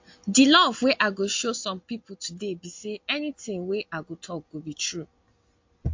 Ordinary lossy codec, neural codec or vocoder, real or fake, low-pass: MP3, 48 kbps; none; real; 7.2 kHz